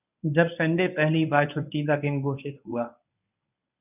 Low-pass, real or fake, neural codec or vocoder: 3.6 kHz; fake; codec, 24 kHz, 0.9 kbps, WavTokenizer, medium speech release version 1